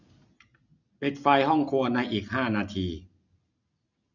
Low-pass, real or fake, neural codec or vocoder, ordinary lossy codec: 7.2 kHz; real; none; none